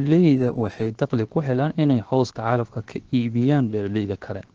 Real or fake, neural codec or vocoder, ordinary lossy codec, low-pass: fake; codec, 16 kHz, 0.7 kbps, FocalCodec; Opus, 16 kbps; 7.2 kHz